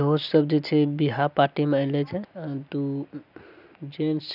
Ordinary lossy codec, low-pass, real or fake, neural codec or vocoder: none; 5.4 kHz; real; none